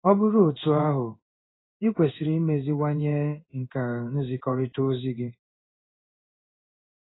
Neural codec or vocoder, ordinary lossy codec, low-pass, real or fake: codec, 16 kHz in and 24 kHz out, 1 kbps, XY-Tokenizer; AAC, 16 kbps; 7.2 kHz; fake